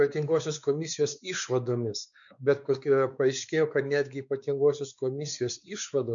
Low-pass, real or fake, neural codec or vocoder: 7.2 kHz; fake; codec, 16 kHz, 4 kbps, X-Codec, WavLM features, trained on Multilingual LibriSpeech